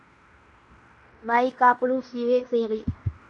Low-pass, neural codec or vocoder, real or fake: 10.8 kHz; codec, 16 kHz in and 24 kHz out, 0.9 kbps, LongCat-Audio-Codec, fine tuned four codebook decoder; fake